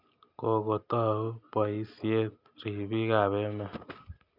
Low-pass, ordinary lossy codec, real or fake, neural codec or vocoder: 5.4 kHz; none; real; none